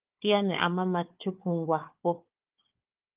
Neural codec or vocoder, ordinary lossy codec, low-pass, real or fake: codec, 16 kHz, 4 kbps, FunCodec, trained on Chinese and English, 50 frames a second; Opus, 24 kbps; 3.6 kHz; fake